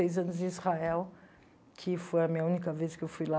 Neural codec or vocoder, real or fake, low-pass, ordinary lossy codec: none; real; none; none